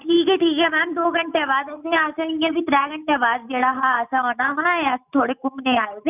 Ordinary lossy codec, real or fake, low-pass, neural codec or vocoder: none; real; 3.6 kHz; none